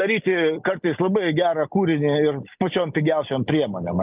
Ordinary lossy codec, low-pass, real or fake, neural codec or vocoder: Opus, 24 kbps; 3.6 kHz; real; none